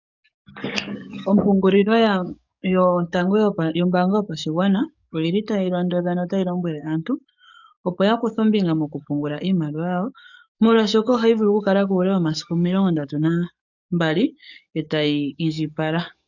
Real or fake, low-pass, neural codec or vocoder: fake; 7.2 kHz; codec, 44.1 kHz, 7.8 kbps, DAC